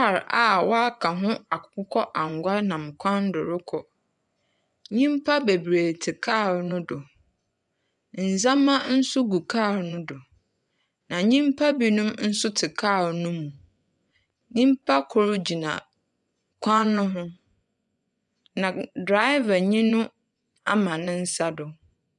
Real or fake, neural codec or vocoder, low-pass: fake; vocoder, 24 kHz, 100 mel bands, Vocos; 10.8 kHz